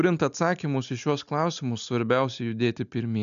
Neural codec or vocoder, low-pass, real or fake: none; 7.2 kHz; real